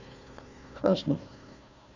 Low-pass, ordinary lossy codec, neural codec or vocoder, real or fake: 7.2 kHz; none; codec, 16 kHz, 1 kbps, FunCodec, trained on Chinese and English, 50 frames a second; fake